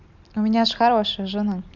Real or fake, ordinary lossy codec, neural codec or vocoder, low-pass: real; none; none; 7.2 kHz